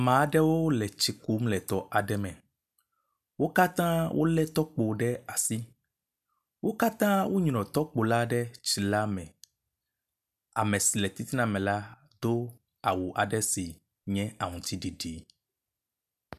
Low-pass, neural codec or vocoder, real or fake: 14.4 kHz; none; real